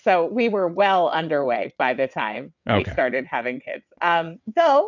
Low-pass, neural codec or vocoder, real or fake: 7.2 kHz; vocoder, 22.05 kHz, 80 mel bands, WaveNeXt; fake